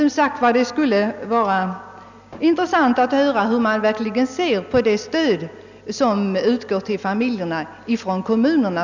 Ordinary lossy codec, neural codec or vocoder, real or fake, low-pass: none; none; real; 7.2 kHz